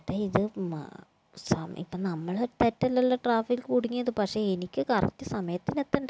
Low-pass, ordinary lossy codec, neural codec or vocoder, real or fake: none; none; none; real